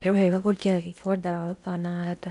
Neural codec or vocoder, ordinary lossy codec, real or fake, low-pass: codec, 16 kHz in and 24 kHz out, 0.8 kbps, FocalCodec, streaming, 65536 codes; none; fake; 10.8 kHz